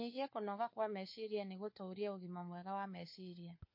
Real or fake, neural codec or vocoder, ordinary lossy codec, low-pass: fake; codec, 16 kHz, 4 kbps, FunCodec, trained on LibriTTS, 50 frames a second; MP3, 32 kbps; 5.4 kHz